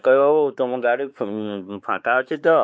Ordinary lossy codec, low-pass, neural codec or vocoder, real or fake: none; none; codec, 16 kHz, 4 kbps, X-Codec, WavLM features, trained on Multilingual LibriSpeech; fake